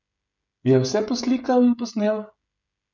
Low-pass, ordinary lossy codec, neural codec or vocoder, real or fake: 7.2 kHz; none; codec, 16 kHz, 16 kbps, FreqCodec, smaller model; fake